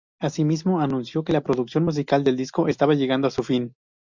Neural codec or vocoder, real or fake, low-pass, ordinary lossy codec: none; real; 7.2 kHz; MP3, 64 kbps